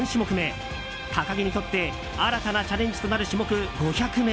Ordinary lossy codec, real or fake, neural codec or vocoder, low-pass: none; real; none; none